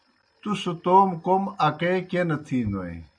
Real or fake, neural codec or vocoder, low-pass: real; none; 9.9 kHz